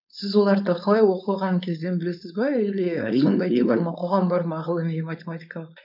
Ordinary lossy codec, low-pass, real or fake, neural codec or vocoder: none; 5.4 kHz; fake; codec, 16 kHz, 4.8 kbps, FACodec